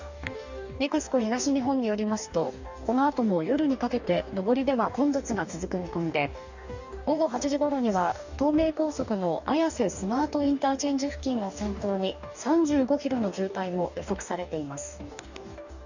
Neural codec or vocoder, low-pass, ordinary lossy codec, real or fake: codec, 44.1 kHz, 2.6 kbps, DAC; 7.2 kHz; none; fake